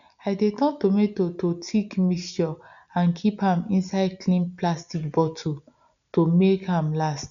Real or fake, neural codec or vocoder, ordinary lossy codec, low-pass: real; none; none; 7.2 kHz